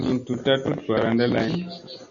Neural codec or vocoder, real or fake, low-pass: none; real; 7.2 kHz